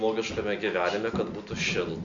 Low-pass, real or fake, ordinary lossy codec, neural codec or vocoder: 7.2 kHz; real; MP3, 64 kbps; none